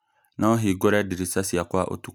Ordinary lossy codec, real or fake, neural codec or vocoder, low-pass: none; real; none; none